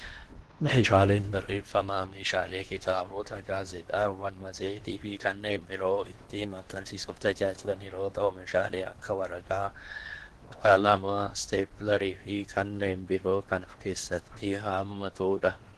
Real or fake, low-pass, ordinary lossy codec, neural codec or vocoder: fake; 10.8 kHz; Opus, 16 kbps; codec, 16 kHz in and 24 kHz out, 0.8 kbps, FocalCodec, streaming, 65536 codes